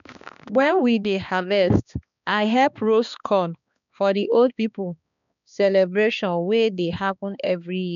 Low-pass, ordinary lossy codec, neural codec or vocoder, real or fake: 7.2 kHz; none; codec, 16 kHz, 2 kbps, X-Codec, HuBERT features, trained on balanced general audio; fake